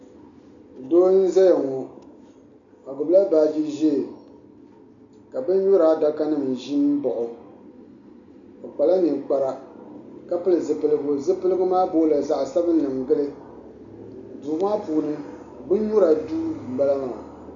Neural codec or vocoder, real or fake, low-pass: none; real; 7.2 kHz